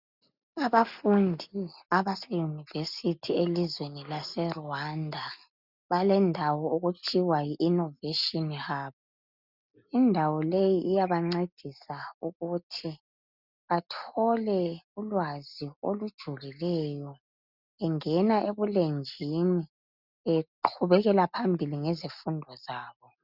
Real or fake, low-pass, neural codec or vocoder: real; 5.4 kHz; none